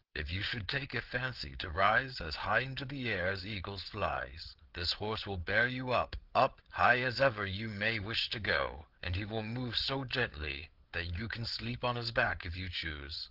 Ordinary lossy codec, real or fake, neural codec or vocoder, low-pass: Opus, 16 kbps; fake; codec, 16 kHz, 8 kbps, FreqCodec, larger model; 5.4 kHz